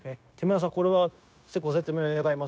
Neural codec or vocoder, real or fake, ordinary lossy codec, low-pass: codec, 16 kHz, 0.9 kbps, LongCat-Audio-Codec; fake; none; none